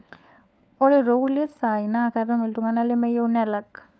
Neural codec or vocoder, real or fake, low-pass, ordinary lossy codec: codec, 16 kHz, 4 kbps, FunCodec, trained on LibriTTS, 50 frames a second; fake; none; none